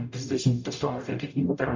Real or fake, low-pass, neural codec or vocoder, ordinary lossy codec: fake; 7.2 kHz; codec, 44.1 kHz, 0.9 kbps, DAC; MP3, 64 kbps